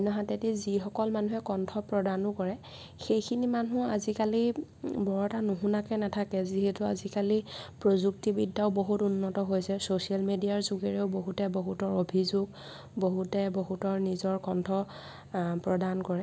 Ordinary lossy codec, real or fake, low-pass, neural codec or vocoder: none; real; none; none